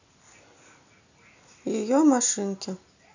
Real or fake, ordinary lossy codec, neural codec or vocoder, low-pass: real; none; none; 7.2 kHz